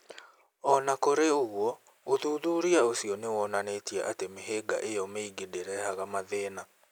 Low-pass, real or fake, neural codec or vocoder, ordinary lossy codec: none; real; none; none